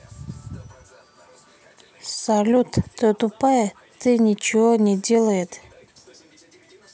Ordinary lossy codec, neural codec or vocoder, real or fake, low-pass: none; none; real; none